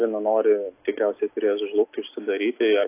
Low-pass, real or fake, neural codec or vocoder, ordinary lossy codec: 3.6 kHz; real; none; AAC, 24 kbps